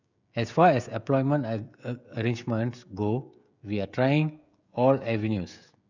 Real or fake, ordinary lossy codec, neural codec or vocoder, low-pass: fake; none; codec, 16 kHz, 16 kbps, FreqCodec, smaller model; 7.2 kHz